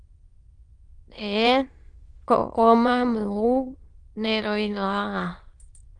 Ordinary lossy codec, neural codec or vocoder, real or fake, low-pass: Opus, 32 kbps; autoencoder, 22.05 kHz, a latent of 192 numbers a frame, VITS, trained on many speakers; fake; 9.9 kHz